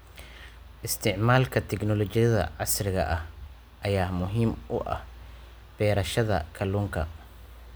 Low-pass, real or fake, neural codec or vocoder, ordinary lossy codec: none; real; none; none